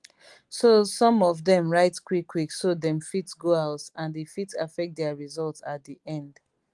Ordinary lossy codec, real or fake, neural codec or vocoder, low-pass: Opus, 24 kbps; real; none; 10.8 kHz